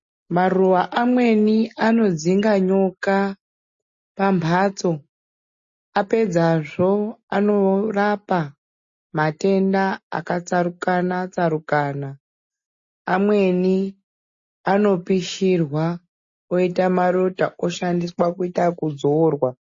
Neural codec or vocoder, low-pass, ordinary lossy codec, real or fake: none; 7.2 kHz; MP3, 32 kbps; real